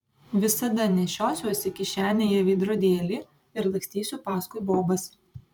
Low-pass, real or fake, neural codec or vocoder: 19.8 kHz; fake; vocoder, 44.1 kHz, 128 mel bands every 512 samples, BigVGAN v2